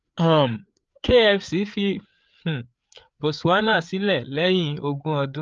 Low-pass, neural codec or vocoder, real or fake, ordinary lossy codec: 7.2 kHz; codec, 16 kHz, 4 kbps, FreqCodec, larger model; fake; Opus, 24 kbps